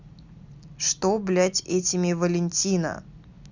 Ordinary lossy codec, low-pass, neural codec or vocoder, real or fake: Opus, 64 kbps; 7.2 kHz; none; real